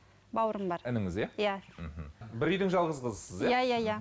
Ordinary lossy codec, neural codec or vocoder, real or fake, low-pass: none; none; real; none